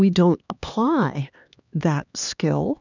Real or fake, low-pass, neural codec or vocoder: fake; 7.2 kHz; codec, 16 kHz, 2 kbps, X-Codec, HuBERT features, trained on LibriSpeech